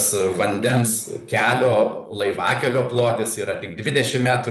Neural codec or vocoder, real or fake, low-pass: vocoder, 44.1 kHz, 128 mel bands, Pupu-Vocoder; fake; 14.4 kHz